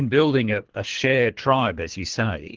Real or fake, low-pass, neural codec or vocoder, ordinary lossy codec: fake; 7.2 kHz; codec, 24 kHz, 3 kbps, HILCodec; Opus, 16 kbps